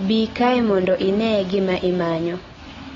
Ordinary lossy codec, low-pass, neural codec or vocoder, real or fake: AAC, 24 kbps; 7.2 kHz; none; real